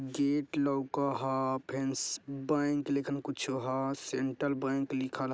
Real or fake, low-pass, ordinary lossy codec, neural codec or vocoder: real; none; none; none